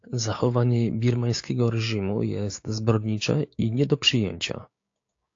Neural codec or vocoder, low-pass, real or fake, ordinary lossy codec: codec, 16 kHz, 6 kbps, DAC; 7.2 kHz; fake; AAC, 48 kbps